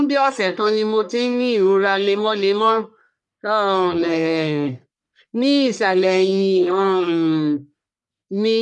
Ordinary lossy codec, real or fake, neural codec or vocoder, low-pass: none; fake; codec, 44.1 kHz, 1.7 kbps, Pupu-Codec; 10.8 kHz